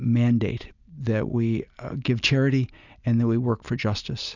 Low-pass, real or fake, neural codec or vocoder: 7.2 kHz; real; none